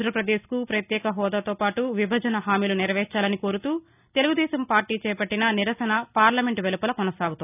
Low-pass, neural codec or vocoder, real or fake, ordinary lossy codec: 3.6 kHz; none; real; none